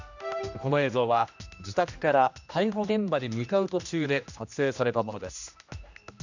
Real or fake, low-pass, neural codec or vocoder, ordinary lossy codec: fake; 7.2 kHz; codec, 16 kHz, 1 kbps, X-Codec, HuBERT features, trained on general audio; none